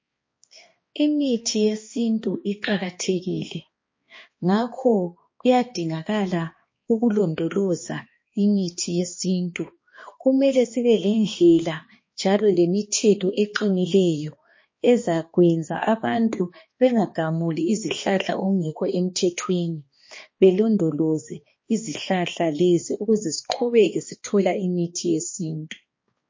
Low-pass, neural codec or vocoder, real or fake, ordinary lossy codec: 7.2 kHz; codec, 16 kHz, 2 kbps, X-Codec, HuBERT features, trained on balanced general audio; fake; MP3, 32 kbps